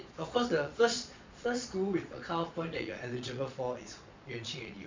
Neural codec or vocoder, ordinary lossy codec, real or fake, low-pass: vocoder, 22.05 kHz, 80 mel bands, Vocos; MP3, 64 kbps; fake; 7.2 kHz